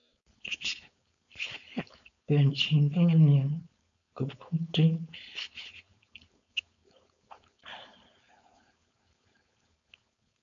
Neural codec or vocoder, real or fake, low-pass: codec, 16 kHz, 4.8 kbps, FACodec; fake; 7.2 kHz